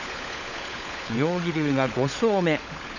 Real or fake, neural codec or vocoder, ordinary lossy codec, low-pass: fake; codec, 16 kHz, 16 kbps, FunCodec, trained on LibriTTS, 50 frames a second; none; 7.2 kHz